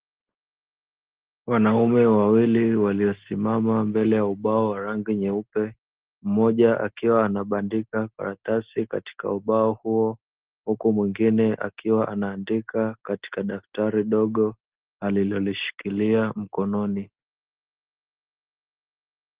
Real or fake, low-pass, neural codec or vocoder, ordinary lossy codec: real; 3.6 kHz; none; Opus, 16 kbps